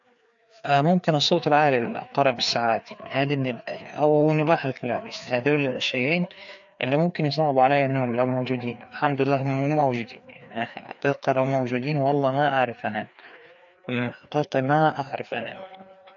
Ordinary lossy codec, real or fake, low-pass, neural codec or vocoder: AAC, 64 kbps; fake; 7.2 kHz; codec, 16 kHz, 2 kbps, FreqCodec, larger model